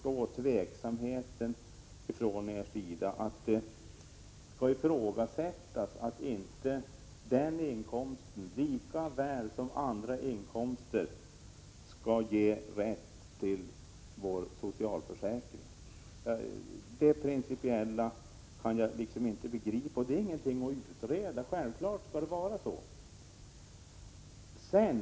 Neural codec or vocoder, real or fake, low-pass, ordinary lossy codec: none; real; none; none